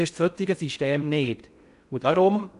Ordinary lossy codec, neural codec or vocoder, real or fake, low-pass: AAC, 96 kbps; codec, 16 kHz in and 24 kHz out, 0.6 kbps, FocalCodec, streaming, 4096 codes; fake; 10.8 kHz